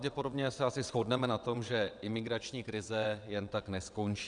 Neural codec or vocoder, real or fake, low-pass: vocoder, 22.05 kHz, 80 mel bands, WaveNeXt; fake; 9.9 kHz